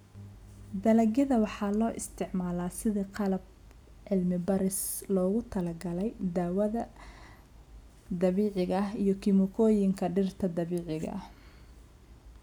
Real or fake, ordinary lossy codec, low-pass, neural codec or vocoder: real; none; 19.8 kHz; none